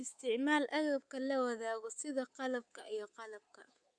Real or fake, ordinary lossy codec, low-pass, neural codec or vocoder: fake; Opus, 64 kbps; 9.9 kHz; codec, 24 kHz, 3.1 kbps, DualCodec